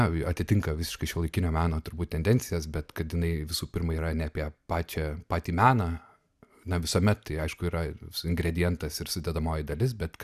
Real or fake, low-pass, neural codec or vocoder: real; 14.4 kHz; none